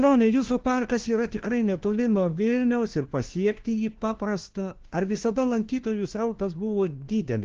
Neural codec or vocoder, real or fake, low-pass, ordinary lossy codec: codec, 16 kHz, 1 kbps, FunCodec, trained on LibriTTS, 50 frames a second; fake; 7.2 kHz; Opus, 16 kbps